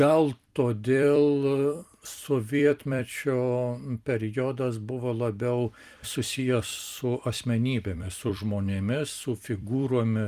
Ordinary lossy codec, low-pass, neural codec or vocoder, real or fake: Opus, 32 kbps; 14.4 kHz; vocoder, 44.1 kHz, 128 mel bands every 256 samples, BigVGAN v2; fake